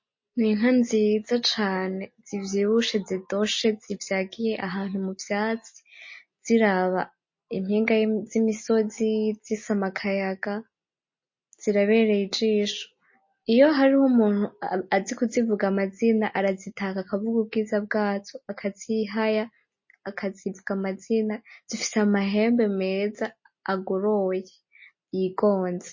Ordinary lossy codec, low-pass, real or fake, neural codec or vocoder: MP3, 32 kbps; 7.2 kHz; real; none